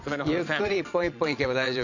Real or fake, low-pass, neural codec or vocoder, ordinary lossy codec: fake; 7.2 kHz; vocoder, 22.05 kHz, 80 mel bands, Vocos; none